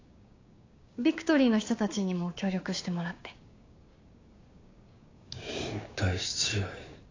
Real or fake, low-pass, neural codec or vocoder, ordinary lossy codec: fake; 7.2 kHz; codec, 16 kHz, 6 kbps, DAC; AAC, 32 kbps